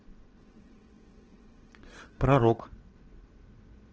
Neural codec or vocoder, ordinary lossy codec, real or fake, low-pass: vocoder, 22.05 kHz, 80 mel bands, WaveNeXt; Opus, 16 kbps; fake; 7.2 kHz